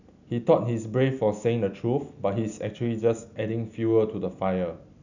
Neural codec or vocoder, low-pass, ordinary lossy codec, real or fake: none; 7.2 kHz; none; real